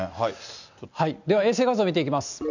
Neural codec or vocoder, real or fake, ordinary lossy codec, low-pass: none; real; none; 7.2 kHz